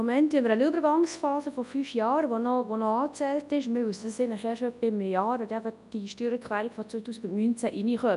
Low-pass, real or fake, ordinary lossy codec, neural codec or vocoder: 10.8 kHz; fake; none; codec, 24 kHz, 0.9 kbps, WavTokenizer, large speech release